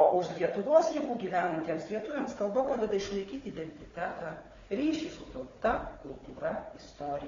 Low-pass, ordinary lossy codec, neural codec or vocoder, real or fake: 7.2 kHz; AAC, 32 kbps; codec, 16 kHz, 4 kbps, FunCodec, trained on Chinese and English, 50 frames a second; fake